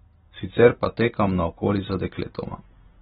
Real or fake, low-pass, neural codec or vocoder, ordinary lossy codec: real; 14.4 kHz; none; AAC, 16 kbps